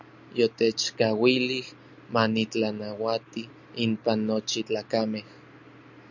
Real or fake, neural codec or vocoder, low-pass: real; none; 7.2 kHz